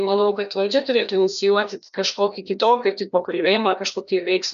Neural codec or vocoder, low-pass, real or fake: codec, 16 kHz, 1 kbps, FreqCodec, larger model; 7.2 kHz; fake